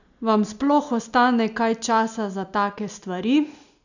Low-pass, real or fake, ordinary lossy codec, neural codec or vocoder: 7.2 kHz; real; none; none